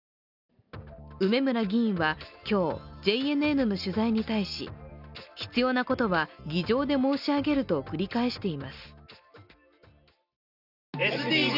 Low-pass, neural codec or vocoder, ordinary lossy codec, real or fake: 5.4 kHz; none; none; real